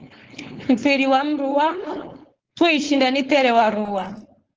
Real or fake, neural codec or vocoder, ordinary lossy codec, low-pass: fake; codec, 16 kHz, 4.8 kbps, FACodec; Opus, 16 kbps; 7.2 kHz